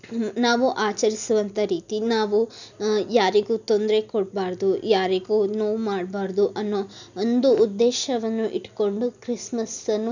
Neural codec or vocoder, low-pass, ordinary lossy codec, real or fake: none; 7.2 kHz; none; real